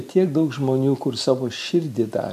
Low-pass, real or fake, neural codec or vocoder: 14.4 kHz; real; none